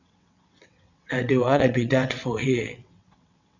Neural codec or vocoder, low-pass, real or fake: vocoder, 22.05 kHz, 80 mel bands, WaveNeXt; 7.2 kHz; fake